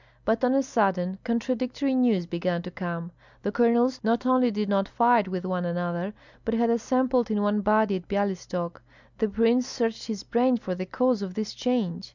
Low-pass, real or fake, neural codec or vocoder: 7.2 kHz; real; none